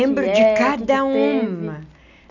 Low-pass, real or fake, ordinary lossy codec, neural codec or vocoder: 7.2 kHz; real; none; none